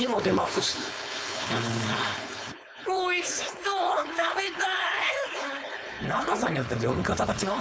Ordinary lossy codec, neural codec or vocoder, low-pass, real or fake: none; codec, 16 kHz, 4.8 kbps, FACodec; none; fake